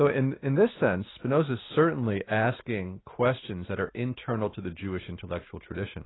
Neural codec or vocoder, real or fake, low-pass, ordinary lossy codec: none; real; 7.2 kHz; AAC, 16 kbps